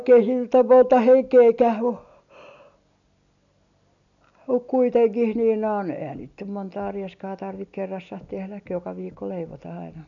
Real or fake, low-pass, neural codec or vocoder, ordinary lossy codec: real; 7.2 kHz; none; none